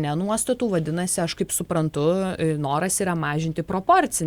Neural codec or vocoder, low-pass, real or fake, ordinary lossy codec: none; 19.8 kHz; real; Opus, 64 kbps